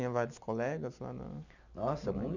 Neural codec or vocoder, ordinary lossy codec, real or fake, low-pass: none; none; real; 7.2 kHz